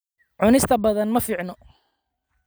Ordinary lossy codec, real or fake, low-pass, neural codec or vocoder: none; real; none; none